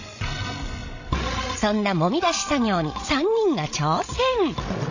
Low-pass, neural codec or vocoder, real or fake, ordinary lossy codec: 7.2 kHz; codec, 16 kHz, 16 kbps, FreqCodec, larger model; fake; AAC, 48 kbps